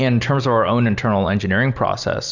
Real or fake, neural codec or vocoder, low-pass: real; none; 7.2 kHz